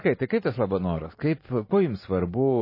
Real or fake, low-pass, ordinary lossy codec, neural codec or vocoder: fake; 5.4 kHz; MP3, 24 kbps; vocoder, 44.1 kHz, 128 mel bands every 512 samples, BigVGAN v2